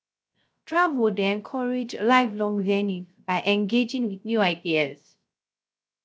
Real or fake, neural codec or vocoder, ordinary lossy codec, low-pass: fake; codec, 16 kHz, 0.3 kbps, FocalCodec; none; none